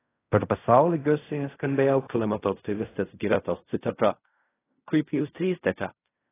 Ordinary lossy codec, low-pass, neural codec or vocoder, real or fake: AAC, 16 kbps; 3.6 kHz; codec, 16 kHz in and 24 kHz out, 0.4 kbps, LongCat-Audio-Codec, fine tuned four codebook decoder; fake